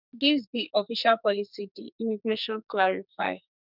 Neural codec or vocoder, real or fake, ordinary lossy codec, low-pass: codec, 44.1 kHz, 2.6 kbps, SNAC; fake; none; 5.4 kHz